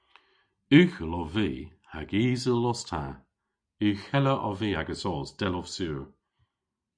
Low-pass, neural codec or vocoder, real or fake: 9.9 kHz; none; real